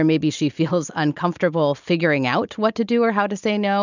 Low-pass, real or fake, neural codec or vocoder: 7.2 kHz; real; none